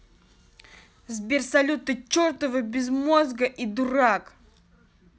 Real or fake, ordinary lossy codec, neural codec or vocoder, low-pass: real; none; none; none